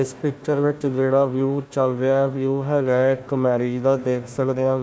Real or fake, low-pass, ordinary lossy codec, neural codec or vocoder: fake; none; none; codec, 16 kHz, 1 kbps, FunCodec, trained on Chinese and English, 50 frames a second